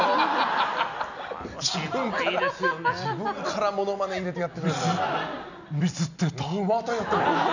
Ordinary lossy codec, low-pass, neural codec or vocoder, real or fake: none; 7.2 kHz; none; real